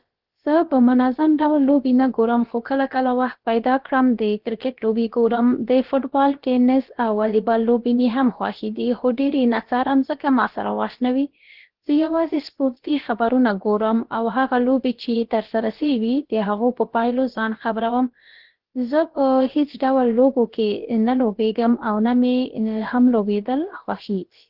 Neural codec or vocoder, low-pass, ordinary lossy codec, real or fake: codec, 16 kHz, about 1 kbps, DyCAST, with the encoder's durations; 5.4 kHz; Opus, 16 kbps; fake